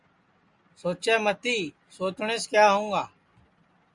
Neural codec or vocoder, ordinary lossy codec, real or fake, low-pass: none; Opus, 64 kbps; real; 10.8 kHz